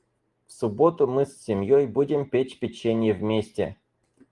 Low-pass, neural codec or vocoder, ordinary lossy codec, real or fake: 10.8 kHz; none; Opus, 24 kbps; real